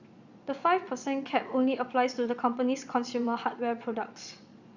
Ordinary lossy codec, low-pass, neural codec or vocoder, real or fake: Opus, 64 kbps; 7.2 kHz; vocoder, 22.05 kHz, 80 mel bands, Vocos; fake